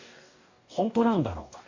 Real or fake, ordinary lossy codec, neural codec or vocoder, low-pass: fake; AAC, 48 kbps; codec, 44.1 kHz, 2.6 kbps, DAC; 7.2 kHz